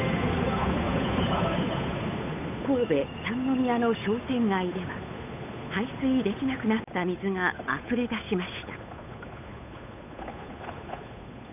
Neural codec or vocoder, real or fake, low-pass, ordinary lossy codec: codec, 16 kHz, 8 kbps, FunCodec, trained on Chinese and English, 25 frames a second; fake; 3.6 kHz; none